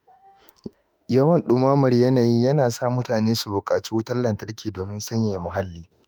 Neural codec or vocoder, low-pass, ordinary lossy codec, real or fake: autoencoder, 48 kHz, 32 numbers a frame, DAC-VAE, trained on Japanese speech; none; none; fake